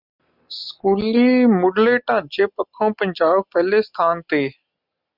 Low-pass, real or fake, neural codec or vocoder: 5.4 kHz; real; none